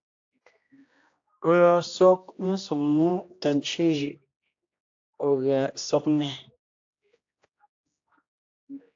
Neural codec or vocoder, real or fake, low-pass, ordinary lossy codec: codec, 16 kHz, 1 kbps, X-Codec, HuBERT features, trained on general audio; fake; 7.2 kHz; AAC, 48 kbps